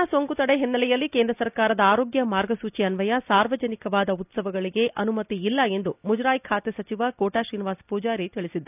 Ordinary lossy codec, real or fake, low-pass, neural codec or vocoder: none; real; 3.6 kHz; none